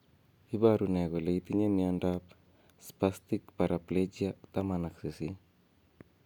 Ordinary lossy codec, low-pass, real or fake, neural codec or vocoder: none; 19.8 kHz; real; none